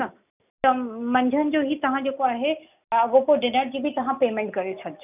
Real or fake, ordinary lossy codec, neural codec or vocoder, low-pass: real; none; none; 3.6 kHz